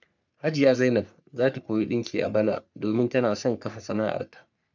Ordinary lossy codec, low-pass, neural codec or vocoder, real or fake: none; 7.2 kHz; codec, 44.1 kHz, 3.4 kbps, Pupu-Codec; fake